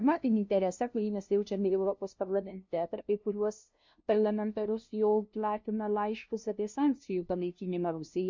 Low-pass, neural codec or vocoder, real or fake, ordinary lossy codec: 7.2 kHz; codec, 16 kHz, 0.5 kbps, FunCodec, trained on LibriTTS, 25 frames a second; fake; MP3, 48 kbps